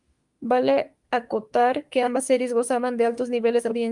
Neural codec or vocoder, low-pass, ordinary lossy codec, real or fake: autoencoder, 48 kHz, 32 numbers a frame, DAC-VAE, trained on Japanese speech; 10.8 kHz; Opus, 32 kbps; fake